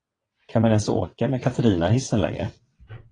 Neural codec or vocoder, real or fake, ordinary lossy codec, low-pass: vocoder, 22.05 kHz, 80 mel bands, WaveNeXt; fake; AAC, 32 kbps; 9.9 kHz